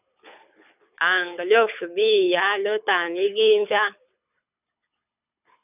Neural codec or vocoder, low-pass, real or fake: codec, 24 kHz, 6 kbps, HILCodec; 3.6 kHz; fake